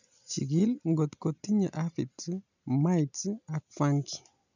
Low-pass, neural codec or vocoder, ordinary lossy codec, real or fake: 7.2 kHz; none; none; real